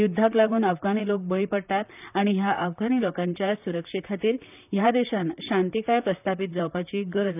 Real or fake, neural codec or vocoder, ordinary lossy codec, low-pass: fake; vocoder, 44.1 kHz, 128 mel bands, Pupu-Vocoder; none; 3.6 kHz